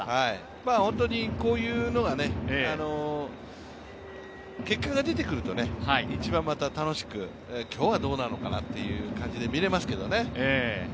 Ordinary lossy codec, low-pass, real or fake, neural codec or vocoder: none; none; real; none